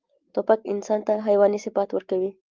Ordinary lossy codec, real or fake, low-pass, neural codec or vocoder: Opus, 32 kbps; real; 7.2 kHz; none